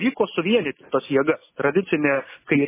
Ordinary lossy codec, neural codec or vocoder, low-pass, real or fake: MP3, 16 kbps; none; 3.6 kHz; real